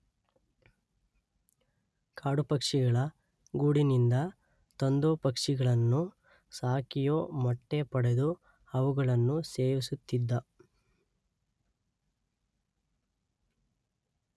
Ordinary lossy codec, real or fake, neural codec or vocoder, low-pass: none; real; none; none